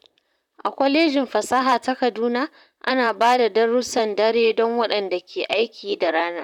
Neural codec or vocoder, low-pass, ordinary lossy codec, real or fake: vocoder, 44.1 kHz, 128 mel bands, Pupu-Vocoder; 19.8 kHz; none; fake